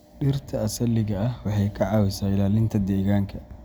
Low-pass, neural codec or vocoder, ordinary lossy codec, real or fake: none; none; none; real